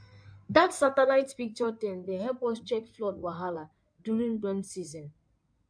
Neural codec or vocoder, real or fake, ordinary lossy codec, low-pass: codec, 16 kHz in and 24 kHz out, 2.2 kbps, FireRedTTS-2 codec; fake; MP3, 64 kbps; 9.9 kHz